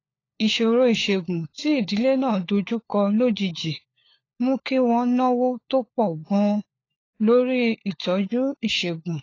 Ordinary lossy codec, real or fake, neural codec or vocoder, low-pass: AAC, 32 kbps; fake; codec, 16 kHz, 4 kbps, FunCodec, trained on LibriTTS, 50 frames a second; 7.2 kHz